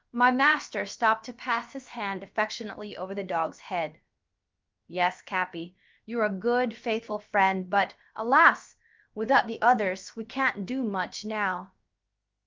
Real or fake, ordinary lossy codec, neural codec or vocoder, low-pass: fake; Opus, 24 kbps; codec, 16 kHz, about 1 kbps, DyCAST, with the encoder's durations; 7.2 kHz